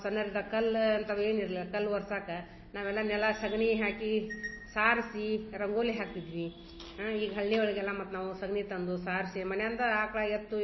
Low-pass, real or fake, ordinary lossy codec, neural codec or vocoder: 7.2 kHz; real; MP3, 24 kbps; none